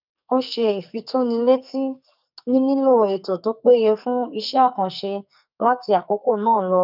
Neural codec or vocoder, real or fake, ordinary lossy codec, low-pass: codec, 44.1 kHz, 2.6 kbps, SNAC; fake; none; 5.4 kHz